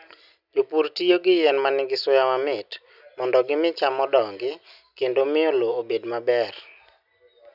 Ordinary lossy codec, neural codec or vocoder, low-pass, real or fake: none; none; 5.4 kHz; real